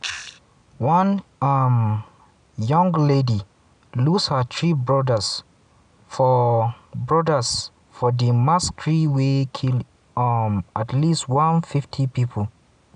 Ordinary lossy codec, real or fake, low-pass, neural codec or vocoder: none; real; 9.9 kHz; none